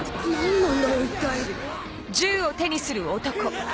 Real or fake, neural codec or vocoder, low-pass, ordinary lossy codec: real; none; none; none